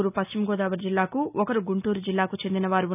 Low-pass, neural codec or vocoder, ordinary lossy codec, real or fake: 3.6 kHz; none; none; real